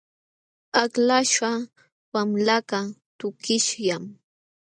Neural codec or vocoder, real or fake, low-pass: none; real; 9.9 kHz